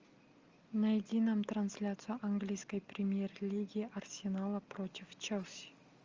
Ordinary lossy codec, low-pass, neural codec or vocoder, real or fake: Opus, 32 kbps; 7.2 kHz; none; real